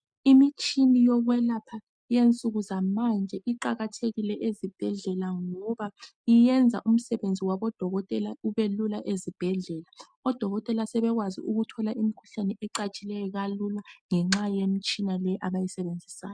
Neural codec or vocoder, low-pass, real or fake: none; 9.9 kHz; real